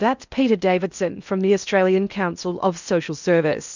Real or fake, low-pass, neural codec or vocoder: fake; 7.2 kHz; codec, 16 kHz in and 24 kHz out, 0.8 kbps, FocalCodec, streaming, 65536 codes